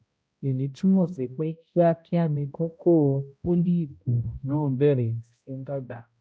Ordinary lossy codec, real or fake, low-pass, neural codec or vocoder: none; fake; none; codec, 16 kHz, 0.5 kbps, X-Codec, HuBERT features, trained on balanced general audio